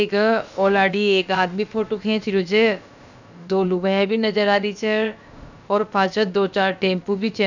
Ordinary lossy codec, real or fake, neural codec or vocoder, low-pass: none; fake; codec, 16 kHz, about 1 kbps, DyCAST, with the encoder's durations; 7.2 kHz